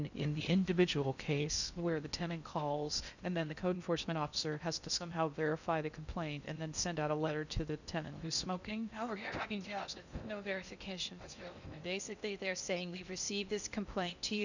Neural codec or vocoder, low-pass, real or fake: codec, 16 kHz in and 24 kHz out, 0.6 kbps, FocalCodec, streaming, 4096 codes; 7.2 kHz; fake